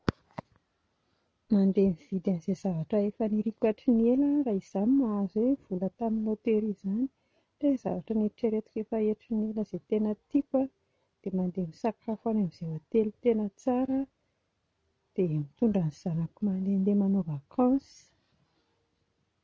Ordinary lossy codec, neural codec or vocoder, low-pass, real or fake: none; none; none; real